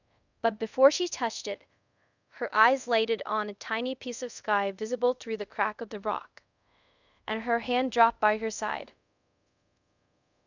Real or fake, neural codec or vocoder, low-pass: fake; codec, 24 kHz, 0.5 kbps, DualCodec; 7.2 kHz